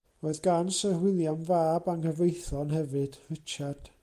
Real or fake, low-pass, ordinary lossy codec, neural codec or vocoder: real; 14.4 kHz; Opus, 32 kbps; none